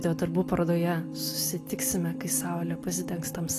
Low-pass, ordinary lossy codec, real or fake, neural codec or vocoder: 14.4 kHz; AAC, 48 kbps; real; none